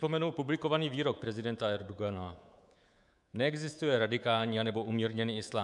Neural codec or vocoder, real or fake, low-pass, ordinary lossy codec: codec, 24 kHz, 3.1 kbps, DualCodec; fake; 10.8 kHz; MP3, 96 kbps